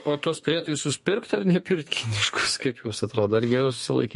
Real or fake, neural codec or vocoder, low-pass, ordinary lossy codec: fake; codec, 32 kHz, 1.9 kbps, SNAC; 14.4 kHz; MP3, 48 kbps